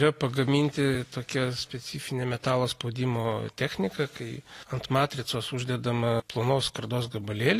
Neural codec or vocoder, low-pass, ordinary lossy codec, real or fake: none; 14.4 kHz; AAC, 48 kbps; real